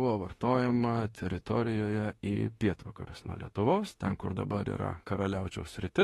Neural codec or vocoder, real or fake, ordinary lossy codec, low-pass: autoencoder, 48 kHz, 32 numbers a frame, DAC-VAE, trained on Japanese speech; fake; AAC, 32 kbps; 19.8 kHz